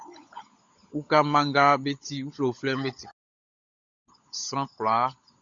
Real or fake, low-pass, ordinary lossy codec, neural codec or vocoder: fake; 7.2 kHz; Opus, 64 kbps; codec, 16 kHz, 16 kbps, FunCodec, trained on LibriTTS, 50 frames a second